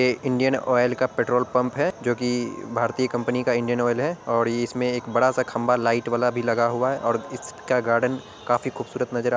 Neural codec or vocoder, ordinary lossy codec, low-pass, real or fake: none; none; none; real